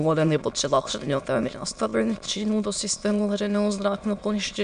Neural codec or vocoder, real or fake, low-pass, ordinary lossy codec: autoencoder, 22.05 kHz, a latent of 192 numbers a frame, VITS, trained on many speakers; fake; 9.9 kHz; MP3, 64 kbps